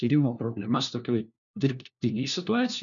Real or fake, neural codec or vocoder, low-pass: fake; codec, 16 kHz, 1 kbps, FunCodec, trained on LibriTTS, 50 frames a second; 7.2 kHz